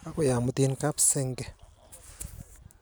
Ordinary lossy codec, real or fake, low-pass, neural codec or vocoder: none; real; none; none